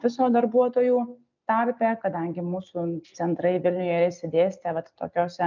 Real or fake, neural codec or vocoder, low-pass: real; none; 7.2 kHz